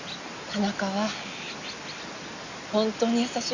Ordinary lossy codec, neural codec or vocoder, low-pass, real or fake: Opus, 64 kbps; none; 7.2 kHz; real